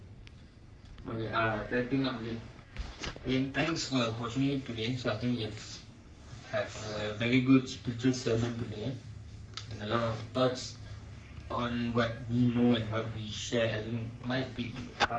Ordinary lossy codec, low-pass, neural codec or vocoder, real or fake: none; 9.9 kHz; codec, 44.1 kHz, 3.4 kbps, Pupu-Codec; fake